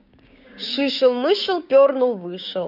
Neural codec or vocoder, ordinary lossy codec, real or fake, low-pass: codec, 16 kHz, 6 kbps, DAC; none; fake; 5.4 kHz